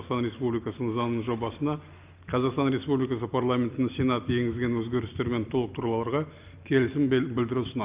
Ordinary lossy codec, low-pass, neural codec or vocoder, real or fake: Opus, 24 kbps; 3.6 kHz; none; real